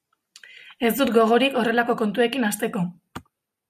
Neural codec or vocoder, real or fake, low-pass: none; real; 14.4 kHz